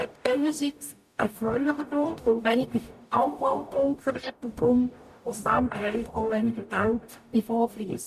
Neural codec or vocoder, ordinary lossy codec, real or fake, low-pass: codec, 44.1 kHz, 0.9 kbps, DAC; none; fake; 14.4 kHz